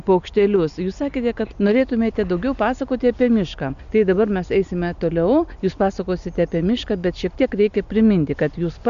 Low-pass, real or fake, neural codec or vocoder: 7.2 kHz; real; none